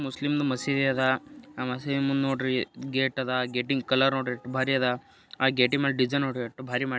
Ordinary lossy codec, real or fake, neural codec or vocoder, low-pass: none; real; none; none